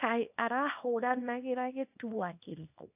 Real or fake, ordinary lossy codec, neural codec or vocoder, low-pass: fake; AAC, 32 kbps; codec, 24 kHz, 0.9 kbps, WavTokenizer, small release; 3.6 kHz